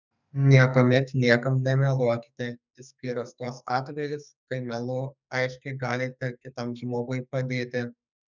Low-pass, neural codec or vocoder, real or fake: 7.2 kHz; codec, 44.1 kHz, 2.6 kbps, SNAC; fake